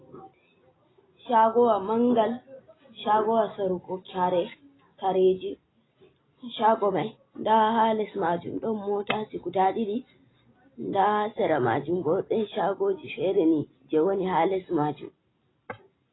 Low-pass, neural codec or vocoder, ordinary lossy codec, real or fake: 7.2 kHz; none; AAC, 16 kbps; real